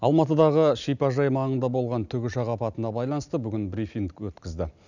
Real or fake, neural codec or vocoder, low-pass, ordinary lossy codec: real; none; 7.2 kHz; none